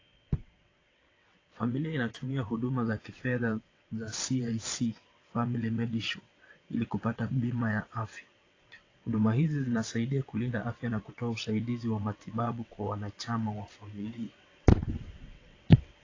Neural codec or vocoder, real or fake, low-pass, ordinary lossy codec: vocoder, 22.05 kHz, 80 mel bands, WaveNeXt; fake; 7.2 kHz; AAC, 32 kbps